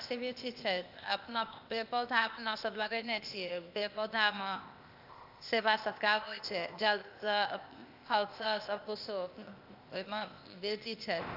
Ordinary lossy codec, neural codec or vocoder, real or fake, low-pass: AAC, 48 kbps; codec, 16 kHz, 0.8 kbps, ZipCodec; fake; 5.4 kHz